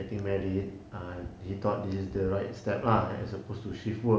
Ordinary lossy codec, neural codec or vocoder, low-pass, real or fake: none; none; none; real